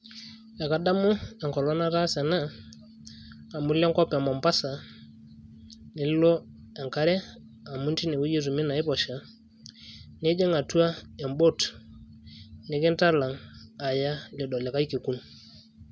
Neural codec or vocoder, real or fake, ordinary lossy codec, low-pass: none; real; none; none